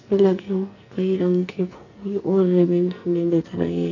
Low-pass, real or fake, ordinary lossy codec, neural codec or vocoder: 7.2 kHz; fake; none; codec, 44.1 kHz, 2.6 kbps, SNAC